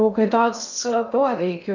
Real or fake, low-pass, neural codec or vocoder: fake; 7.2 kHz; codec, 16 kHz in and 24 kHz out, 0.6 kbps, FocalCodec, streaming, 2048 codes